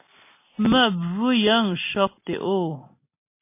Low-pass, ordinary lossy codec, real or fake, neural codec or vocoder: 3.6 kHz; MP3, 24 kbps; real; none